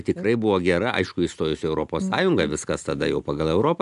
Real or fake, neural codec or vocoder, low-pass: real; none; 10.8 kHz